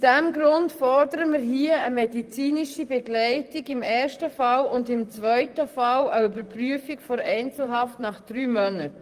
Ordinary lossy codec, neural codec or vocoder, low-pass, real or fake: Opus, 32 kbps; vocoder, 44.1 kHz, 128 mel bands, Pupu-Vocoder; 14.4 kHz; fake